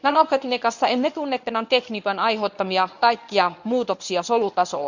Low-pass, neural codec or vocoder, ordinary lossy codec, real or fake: 7.2 kHz; codec, 24 kHz, 0.9 kbps, WavTokenizer, medium speech release version 1; none; fake